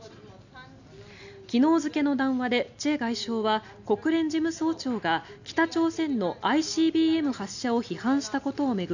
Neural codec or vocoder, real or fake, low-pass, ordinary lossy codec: none; real; 7.2 kHz; none